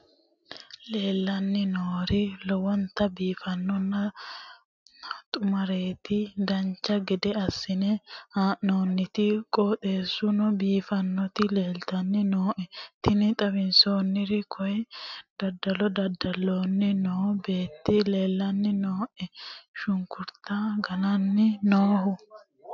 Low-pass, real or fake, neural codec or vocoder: 7.2 kHz; real; none